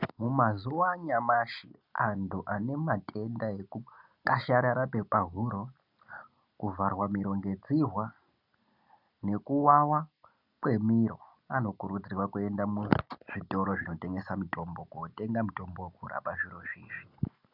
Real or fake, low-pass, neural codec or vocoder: real; 5.4 kHz; none